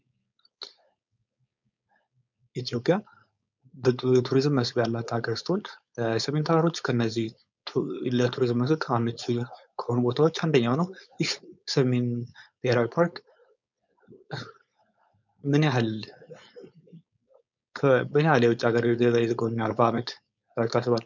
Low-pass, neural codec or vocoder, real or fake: 7.2 kHz; codec, 16 kHz, 4.8 kbps, FACodec; fake